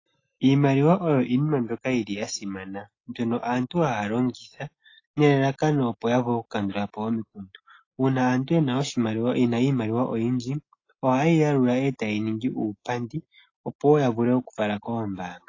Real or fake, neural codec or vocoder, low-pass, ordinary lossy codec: real; none; 7.2 kHz; AAC, 32 kbps